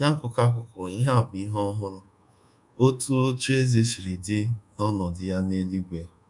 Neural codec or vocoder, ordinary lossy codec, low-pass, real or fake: codec, 24 kHz, 1.2 kbps, DualCodec; none; 10.8 kHz; fake